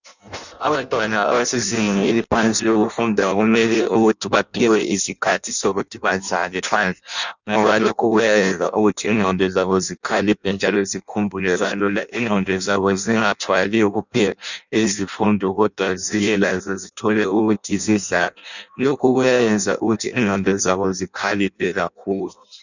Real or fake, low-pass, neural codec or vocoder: fake; 7.2 kHz; codec, 16 kHz in and 24 kHz out, 0.6 kbps, FireRedTTS-2 codec